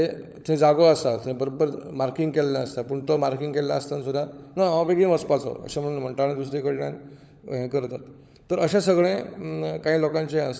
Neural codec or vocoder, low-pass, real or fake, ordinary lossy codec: codec, 16 kHz, 16 kbps, FunCodec, trained on LibriTTS, 50 frames a second; none; fake; none